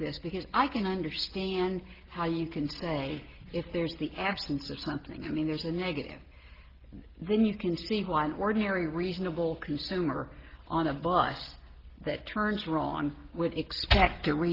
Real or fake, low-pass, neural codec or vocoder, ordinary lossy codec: real; 5.4 kHz; none; Opus, 16 kbps